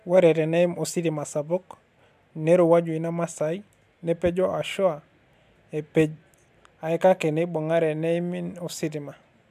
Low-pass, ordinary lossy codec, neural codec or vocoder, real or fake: 14.4 kHz; MP3, 96 kbps; none; real